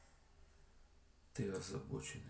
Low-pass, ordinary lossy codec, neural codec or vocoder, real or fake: none; none; none; real